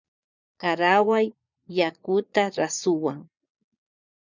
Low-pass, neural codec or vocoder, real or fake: 7.2 kHz; vocoder, 22.05 kHz, 80 mel bands, Vocos; fake